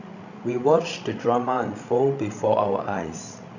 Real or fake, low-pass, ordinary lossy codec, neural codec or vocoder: fake; 7.2 kHz; none; codec, 16 kHz, 16 kbps, FreqCodec, larger model